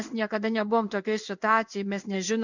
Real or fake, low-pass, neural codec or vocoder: fake; 7.2 kHz; codec, 16 kHz in and 24 kHz out, 1 kbps, XY-Tokenizer